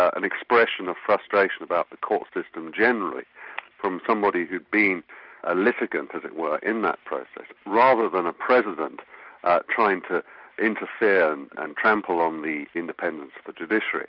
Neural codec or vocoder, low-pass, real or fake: none; 5.4 kHz; real